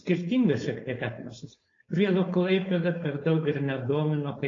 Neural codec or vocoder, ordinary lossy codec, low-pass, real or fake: codec, 16 kHz, 4.8 kbps, FACodec; AAC, 32 kbps; 7.2 kHz; fake